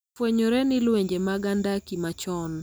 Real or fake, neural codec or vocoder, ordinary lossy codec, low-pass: real; none; none; none